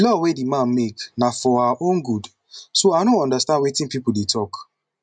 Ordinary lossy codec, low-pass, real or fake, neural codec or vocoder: MP3, 96 kbps; 9.9 kHz; real; none